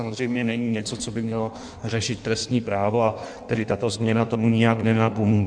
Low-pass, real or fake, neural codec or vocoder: 9.9 kHz; fake; codec, 16 kHz in and 24 kHz out, 1.1 kbps, FireRedTTS-2 codec